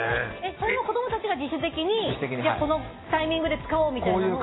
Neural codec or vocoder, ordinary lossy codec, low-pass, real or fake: none; AAC, 16 kbps; 7.2 kHz; real